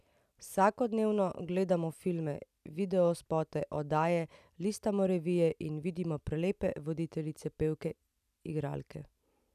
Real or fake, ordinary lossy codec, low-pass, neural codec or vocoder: real; none; 14.4 kHz; none